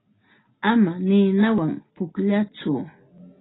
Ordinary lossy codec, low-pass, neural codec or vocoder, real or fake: AAC, 16 kbps; 7.2 kHz; none; real